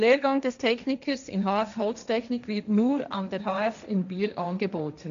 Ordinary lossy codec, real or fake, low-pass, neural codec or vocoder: none; fake; 7.2 kHz; codec, 16 kHz, 1.1 kbps, Voila-Tokenizer